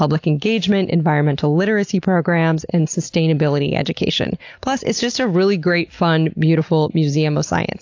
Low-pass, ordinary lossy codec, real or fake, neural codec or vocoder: 7.2 kHz; AAC, 48 kbps; real; none